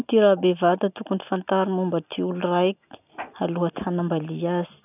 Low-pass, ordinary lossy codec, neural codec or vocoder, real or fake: 3.6 kHz; none; none; real